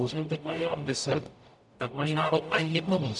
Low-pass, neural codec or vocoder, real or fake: 10.8 kHz; codec, 44.1 kHz, 0.9 kbps, DAC; fake